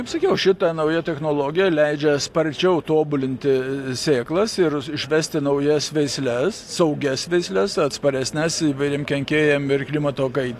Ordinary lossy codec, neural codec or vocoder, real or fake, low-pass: AAC, 64 kbps; none; real; 14.4 kHz